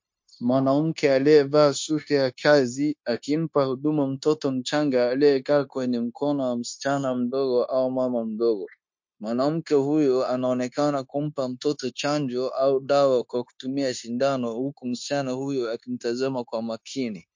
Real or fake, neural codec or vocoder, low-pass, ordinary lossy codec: fake; codec, 16 kHz, 0.9 kbps, LongCat-Audio-Codec; 7.2 kHz; MP3, 48 kbps